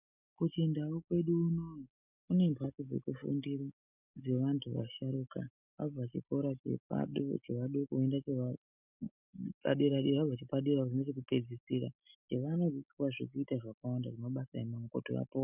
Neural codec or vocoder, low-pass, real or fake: none; 3.6 kHz; real